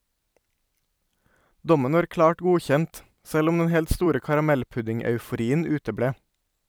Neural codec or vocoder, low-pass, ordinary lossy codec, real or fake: vocoder, 44.1 kHz, 128 mel bands every 256 samples, BigVGAN v2; none; none; fake